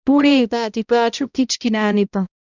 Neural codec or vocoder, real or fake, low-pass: codec, 16 kHz, 0.5 kbps, X-Codec, HuBERT features, trained on balanced general audio; fake; 7.2 kHz